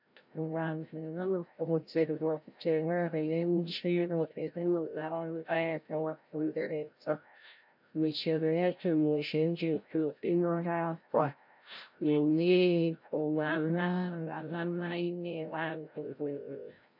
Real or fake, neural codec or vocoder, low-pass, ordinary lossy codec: fake; codec, 16 kHz, 0.5 kbps, FreqCodec, larger model; 5.4 kHz; MP3, 48 kbps